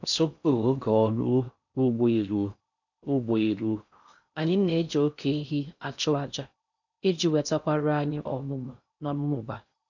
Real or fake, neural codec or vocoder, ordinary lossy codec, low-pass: fake; codec, 16 kHz in and 24 kHz out, 0.6 kbps, FocalCodec, streaming, 4096 codes; none; 7.2 kHz